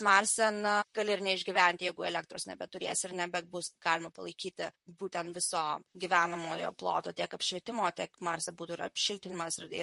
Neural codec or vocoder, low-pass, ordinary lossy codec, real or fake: vocoder, 44.1 kHz, 128 mel bands, Pupu-Vocoder; 14.4 kHz; MP3, 48 kbps; fake